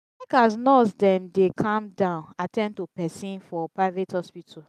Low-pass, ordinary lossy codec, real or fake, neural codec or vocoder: 14.4 kHz; none; real; none